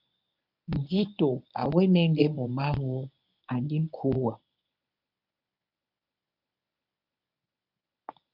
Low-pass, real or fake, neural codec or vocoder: 5.4 kHz; fake; codec, 24 kHz, 0.9 kbps, WavTokenizer, medium speech release version 1